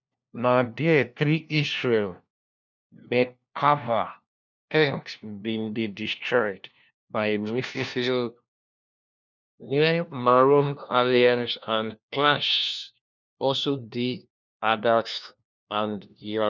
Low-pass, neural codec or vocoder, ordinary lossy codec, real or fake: 7.2 kHz; codec, 16 kHz, 1 kbps, FunCodec, trained on LibriTTS, 50 frames a second; none; fake